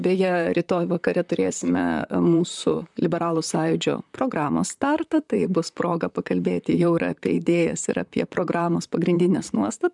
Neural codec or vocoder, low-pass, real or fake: vocoder, 44.1 kHz, 128 mel bands, Pupu-Vocoder; 10.8 kHz; fake